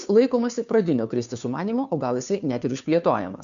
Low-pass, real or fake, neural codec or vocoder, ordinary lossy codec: 7.2 kHz; fake; codec, 16 kHz, 2 kbps, FunCodec, trained on Chinese and English, 25 frames a second; MP3, 96 kbps